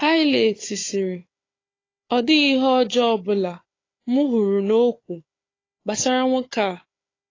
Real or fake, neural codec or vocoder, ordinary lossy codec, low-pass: fake; codec, 16 kHz, 4 kbps, FunCodec, trained on Chinese and English, 50 frames a second; AAC, 32 kbps; 7.2 kHz